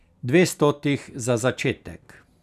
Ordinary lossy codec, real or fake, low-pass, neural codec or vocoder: none; real; 14.4 kHz; none